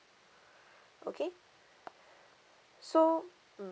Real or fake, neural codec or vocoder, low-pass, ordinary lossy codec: real; none; none; none